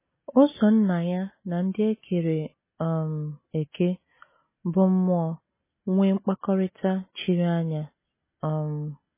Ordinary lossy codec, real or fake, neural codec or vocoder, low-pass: MP3, 16 kbps; real; none; 3.6 kHz